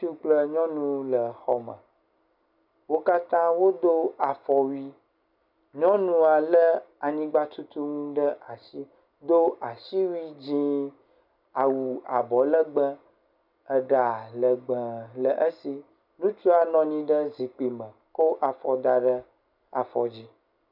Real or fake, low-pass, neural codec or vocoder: real; 5.4 kHz; none